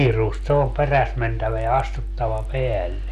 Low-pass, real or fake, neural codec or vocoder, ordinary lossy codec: 14.4 kHz; real; none; none